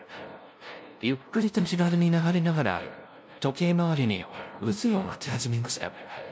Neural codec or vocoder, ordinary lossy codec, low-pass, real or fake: codec, 16 kHz, 0.5 kbps, FunCodec, trained on LibriTTS, 25 frames a second; none; none; fake